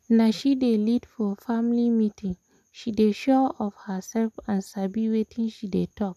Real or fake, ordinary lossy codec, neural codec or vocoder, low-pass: real; none; none; 14.4 kHz